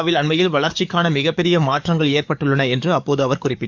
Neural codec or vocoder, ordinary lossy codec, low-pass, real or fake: codec, 44.1 kHz, 7.8 kbps, DAC; none; 7.2 kHz; fake